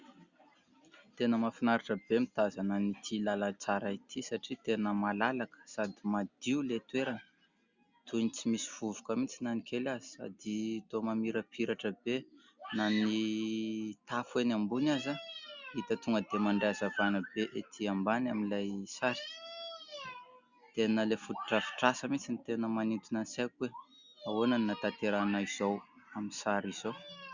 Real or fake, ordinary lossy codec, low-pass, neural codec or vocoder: real; Opus, 64 kbps; 7.2 kHz; none